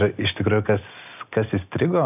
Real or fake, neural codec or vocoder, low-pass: real; none; 3.6 kHz